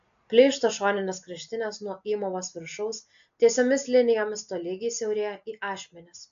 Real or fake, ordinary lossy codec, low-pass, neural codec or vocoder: real; AAC, 64 kbps; 7.2 kHz; none